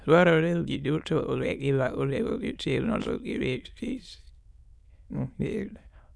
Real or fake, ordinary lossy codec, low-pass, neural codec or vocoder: fake; none; none; autoencoder, 22.05 kHz, a latent of 192 numbers a frame, VITS, trained on many speakers